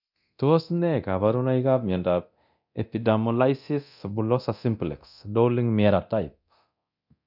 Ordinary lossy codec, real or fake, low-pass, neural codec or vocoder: none; fake; 5.4 kHz; codec, 24 kHz, 0.9 kbps, DualCodec